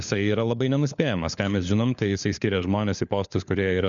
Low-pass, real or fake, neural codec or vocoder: 7.2 kHz; fake; codec, 16 kHz, 4 kbps, FunCodec, trained on LibriTTS, 50 frames a second